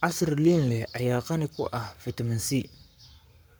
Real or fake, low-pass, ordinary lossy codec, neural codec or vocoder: fake; none; none; codec, 44.1 kHz, 7.8 kbps, Pupu-Codec